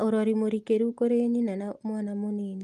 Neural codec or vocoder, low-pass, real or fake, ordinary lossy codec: none; 14.4 kHz; real; Opus, 32 kbps